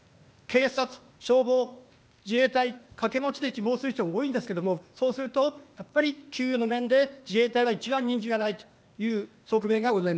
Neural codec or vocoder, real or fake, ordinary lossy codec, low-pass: codec, 16 kHz, 0.8 kbps, ZipCodec; fake; none; none